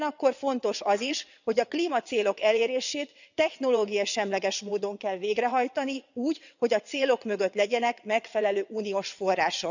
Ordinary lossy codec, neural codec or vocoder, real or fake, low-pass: none; vocoder, 22.05 kHz, 80 mel bands, WaveNeXt; fake; 7.2 kHz